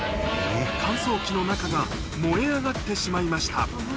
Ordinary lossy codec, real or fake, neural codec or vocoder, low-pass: none; real; none; none